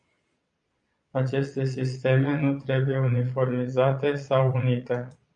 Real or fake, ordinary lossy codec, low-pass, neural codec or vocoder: fake; MP3, 96 kbps; 9.9 kHz; vocoder, 22.05 kHz, 80 mel bands, Vocos